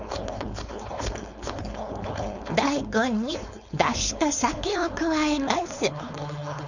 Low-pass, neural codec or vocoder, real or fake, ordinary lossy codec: 7.2 kHz; codec, 16 kHz, 4.8 kbps, FACodec; fake; none